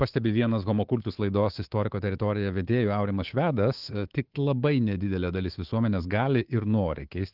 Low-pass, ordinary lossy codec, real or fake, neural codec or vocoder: 5.4 kHz; Opus, 16 kbps; fake; codec, 24 kHz, 3.1 kbps, DualCodec